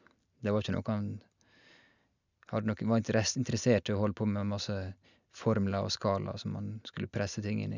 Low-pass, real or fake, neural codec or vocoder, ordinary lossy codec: 7.2 kHz; real; none; none